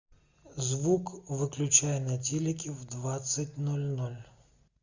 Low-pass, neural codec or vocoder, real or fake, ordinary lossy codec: 7.2 kHz; none; real; Opus, 64 kbps